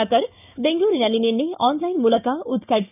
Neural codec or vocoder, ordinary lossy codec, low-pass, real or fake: codec, 16 kHz, 6 kbps, DAC; none; 3.6 kHz; fake